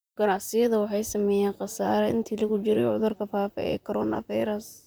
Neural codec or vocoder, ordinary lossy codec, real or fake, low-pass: vocoder, 44.1 kHz, 128 mel bands, Pupu-Vocoder; none; fake; none